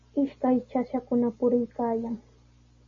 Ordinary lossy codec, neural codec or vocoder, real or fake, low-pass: MP3, 32 kbps; none; real; 7.2 kHz